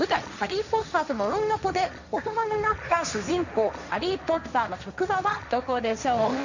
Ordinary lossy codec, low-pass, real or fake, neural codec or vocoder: none; 7.2 kHz; fake; codec, 16 kHz, 1.1 kbps, Voila-Tokenizer